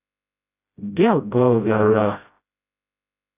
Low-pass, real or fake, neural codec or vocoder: 3.6 kHz; fake; codec, 16 kHz, 0.5 kbps, FreqCodec, smaller model